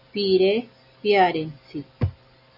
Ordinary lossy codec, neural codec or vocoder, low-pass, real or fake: AAC, 48 kbps; none; 5.4 kHz; real